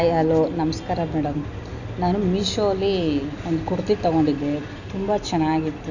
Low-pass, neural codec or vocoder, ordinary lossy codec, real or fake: 7.2 kHz; none; none; real